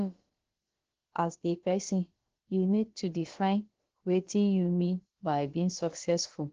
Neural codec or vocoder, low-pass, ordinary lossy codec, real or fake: codec, 16 kHz, about 1 kbps, DyCAST, with the encoder's durations; 7.2 kHz; Opus, 16 kbps; fake